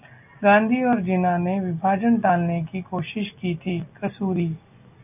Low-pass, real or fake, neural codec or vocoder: 3.6 kHz; real; none